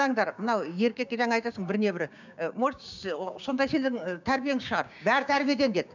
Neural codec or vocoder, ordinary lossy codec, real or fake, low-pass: codec, 16 kHz, 6 kbps, DAC; none; fake; 7.2 kHz